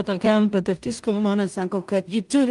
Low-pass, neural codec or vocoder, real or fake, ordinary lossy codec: 10.8 kHz; codec, 16 kHz in and 24 kHz out, 0.4 kbps, LongCat-Audio-Codec, two codebook decoder; fake; Opus, 32 kbps